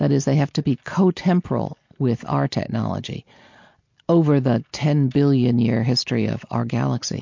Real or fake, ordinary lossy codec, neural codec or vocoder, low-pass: real; MP3, 48 kbps; none; 7.2 kHz